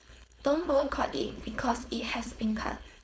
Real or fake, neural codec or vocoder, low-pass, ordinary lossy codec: fake; codec, 16 kHz, 4.8 kbps, FACodec; none; none